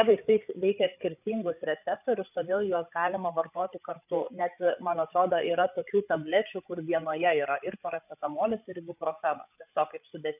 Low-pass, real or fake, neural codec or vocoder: 3.6 kHz; fake; codec, 16 kHz, 8 kbps, FreqCodec, larger model